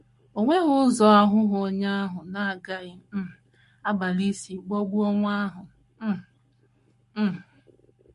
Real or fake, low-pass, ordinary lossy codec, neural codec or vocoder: fake; 14.4 kHz; MP3, 48 kbps; codec, 44.1 kHz, 7.8 kbps, Pupu-Codec